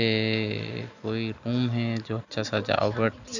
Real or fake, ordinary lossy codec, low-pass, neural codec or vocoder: real; none; 7.2 kHz; none